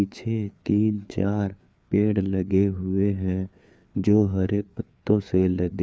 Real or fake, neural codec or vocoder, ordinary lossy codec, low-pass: fake; codec, 16 kHz, 4 kbps, FreqCodec, larger model; none; none